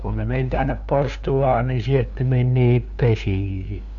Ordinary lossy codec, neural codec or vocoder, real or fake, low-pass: none; codec, 16 kHz, 2 kbps, FunCodec, trained on LibriTTS, 25 frames a second; fake; 7.2 kHz